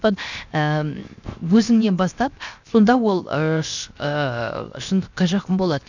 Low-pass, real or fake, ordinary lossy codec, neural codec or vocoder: 7.2 kHz; fake; none; codec, 16 kHz, 0.7 kbps, FocalCodec